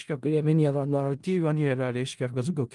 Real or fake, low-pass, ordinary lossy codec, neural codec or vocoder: fake; 10.8 kHz; Opus, 24 kbps; codec, 16 kHz in and 24 kHz out, 0.4 kbps, LongCat-Audio-Codec, four codebook decoder